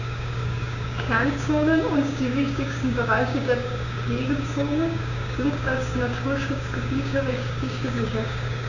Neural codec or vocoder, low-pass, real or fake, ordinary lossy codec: codec, 16 kHz, 6 kbps, DAC; 7.2 kHz; fake; none